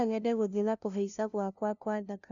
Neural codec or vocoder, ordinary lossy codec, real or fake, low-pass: codec, 16 kHz, 0.5 kbps, FunCodec, trained on LibriTTS, 25 frames a second; none; fake; 7.2 kHz